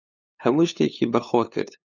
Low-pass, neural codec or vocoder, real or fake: 7.2 kHz; codec, 16 kHz, 8 kbps, FunCodec, trained on LibriTTS, 25 frames a second; fake